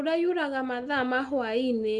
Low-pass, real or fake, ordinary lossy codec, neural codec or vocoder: 10.8 kHz; real; Opus, 32 kbps; none